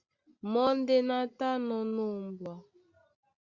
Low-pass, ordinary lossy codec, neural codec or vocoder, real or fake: 7.2 kHz; Opus, 64 kbps; none; real